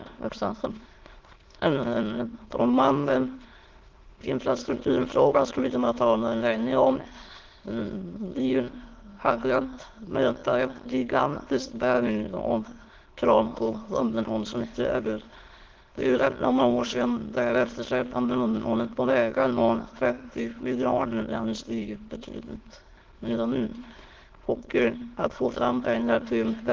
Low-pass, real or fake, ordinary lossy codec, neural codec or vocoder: 7.2 kHz; fake; Opus, 16 kbps; autoencoder, 22.05 kHz, a latent of 192 numbers a frame, VITS, trained on many speakers